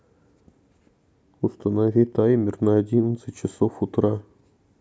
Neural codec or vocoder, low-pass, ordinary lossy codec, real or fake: none; none; none; real